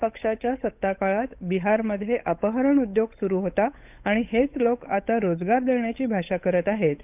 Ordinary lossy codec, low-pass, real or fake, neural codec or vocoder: none; 3.6 kHz; fake; codec, 16 kHz, 8 kbps, FunCodec, trained on Chinese and English, 25 frames a second